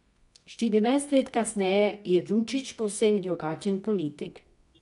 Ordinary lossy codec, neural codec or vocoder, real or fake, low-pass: none; codec, 24 kHz, 0.9 kbps, WavTokenizer, medium music audio release; fake; 10.8 kHz